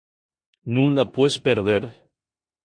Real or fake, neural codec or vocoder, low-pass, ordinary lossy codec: fake; codec, 16 kHz in and 24 kHz out, 0.9 kbps, LongCat-Audio-Codec, four codebook decoder; 9.9 kHz; MP3, 48 kbps